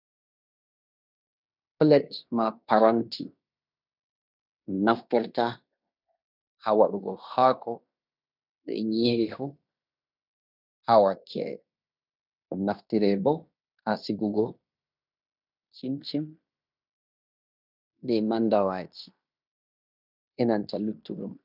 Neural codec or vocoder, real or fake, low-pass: codec, 16 kHz in and 24 kHz out, 0.9 kbps, LongCat-Audio-Codec, fine tuned four codebook decoder; fake; 5.4 kHz